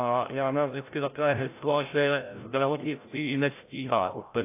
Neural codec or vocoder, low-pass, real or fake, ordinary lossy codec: codec, 16 kHz, 0.5 kbps, FreqCodec, larger model; 3.6 kHz; fake; AAC, 24 kbps